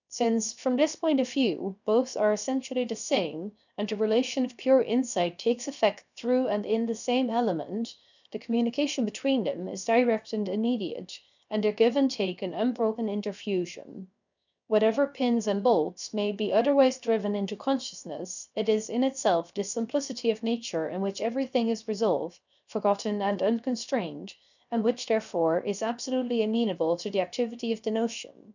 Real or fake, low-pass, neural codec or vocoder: fake; 7.2 kHz; codec, 16 kHz, 0.7 kbps, FocalCodec